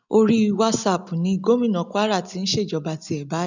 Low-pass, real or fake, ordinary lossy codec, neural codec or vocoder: 7.2 kHz; real; none; none